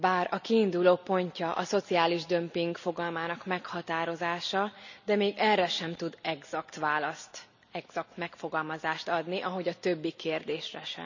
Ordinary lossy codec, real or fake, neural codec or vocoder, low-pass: none; real; none; 7.2 kHz